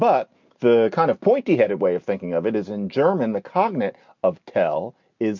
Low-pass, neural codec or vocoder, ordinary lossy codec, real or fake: 7.2 kHz; none; MP3, 64 kbps; real